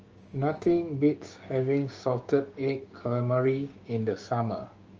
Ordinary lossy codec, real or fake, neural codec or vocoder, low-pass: Opus, 24 kbps; fake; codec, 16 kHz, 6 kbps, DAC; 7.2 kHz